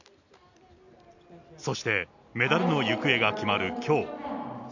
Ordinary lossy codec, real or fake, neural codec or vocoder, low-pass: none; real; none; 7.2 kHz